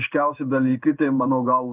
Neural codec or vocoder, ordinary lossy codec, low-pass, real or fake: codec, 16 kHz in and 24 kHz out, 1 kbps, XY-Tokenizer; Opus, 32 kbps; 3.6 kHz; fake